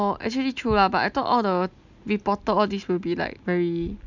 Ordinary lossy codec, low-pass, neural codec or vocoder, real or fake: none; 7.2 kHz; none; real